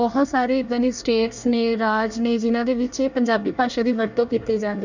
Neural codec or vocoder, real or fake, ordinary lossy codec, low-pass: codec, 24 kHz, 1 kbps, SNAC; fake; none; 7.2 kHz